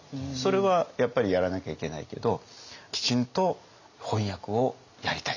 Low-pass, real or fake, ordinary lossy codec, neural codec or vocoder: 7.2 kHz; real; none; none